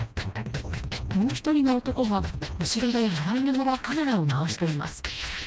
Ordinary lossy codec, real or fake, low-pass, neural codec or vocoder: none; fake; none; codec, 16 kHz, 1 kbps, FreqCodec, smaller model